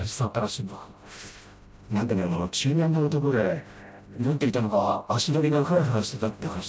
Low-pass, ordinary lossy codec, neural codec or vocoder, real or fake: none; none; codec, 16 kHz, 0.5 kbps, FreqCodec, smaller model; fake